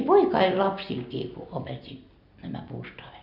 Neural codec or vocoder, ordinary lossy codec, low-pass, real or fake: none; none; 5.4 kHz; real